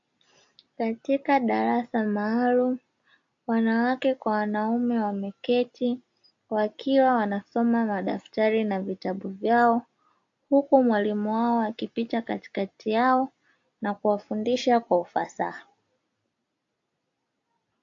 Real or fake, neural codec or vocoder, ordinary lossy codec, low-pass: real; none; AAC, 48 kbps; 7.2 kHz